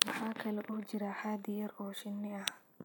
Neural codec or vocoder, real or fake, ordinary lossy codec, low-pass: none; real; none; none